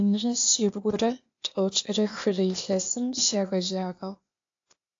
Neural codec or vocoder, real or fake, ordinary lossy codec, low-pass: codec, 16 kHz, 0.8 kbps, ZipCodec; fake; AAC, 48 kbps; 7.2 kHz